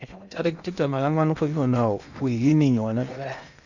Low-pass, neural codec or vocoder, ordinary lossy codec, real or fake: 7.2 kHz; codec, 16 kHz in and 24 kHz out, 0.8 kbps, FocalCodec, streaming, 65536 codes; none; fake